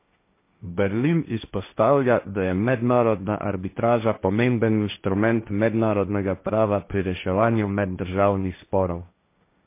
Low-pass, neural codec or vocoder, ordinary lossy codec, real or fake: 3.6 kHz; codec, 16 kHz, 1.1 kbps, Voila-Tokenizer; MP3, 24 kbps; fake